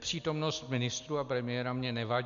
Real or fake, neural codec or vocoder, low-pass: real; none; 7.2 kHz